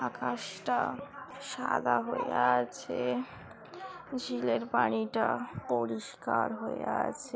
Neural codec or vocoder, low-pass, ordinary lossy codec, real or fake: none; none; none; real